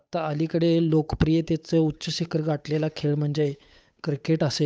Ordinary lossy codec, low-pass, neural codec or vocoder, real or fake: none; none; codec, 16 kHz, 8 kbps, FunCodec, trained on Chinese and English, 25 frames a second; fake